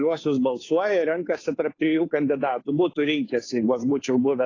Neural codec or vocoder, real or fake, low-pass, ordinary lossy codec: codec, 16 kHz, 2 kbps, FunCodec, trained on Chinese and English, 25 frames a second; fake; 7.2 kHz; AAC, 32 kbps